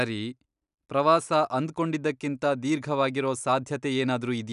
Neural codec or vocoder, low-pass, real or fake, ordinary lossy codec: none; 10.8 kHz; real; none